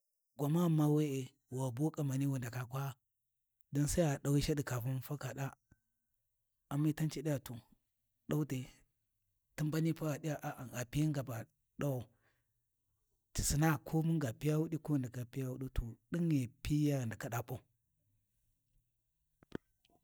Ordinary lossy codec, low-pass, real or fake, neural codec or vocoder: none; none; real; none